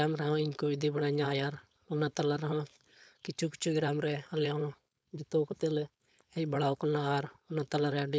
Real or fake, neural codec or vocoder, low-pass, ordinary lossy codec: fake; codec, 16 kHz, 4.8 kbps, FACodec; none; none